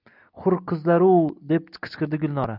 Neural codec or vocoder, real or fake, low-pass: none; real; 5.4 kHz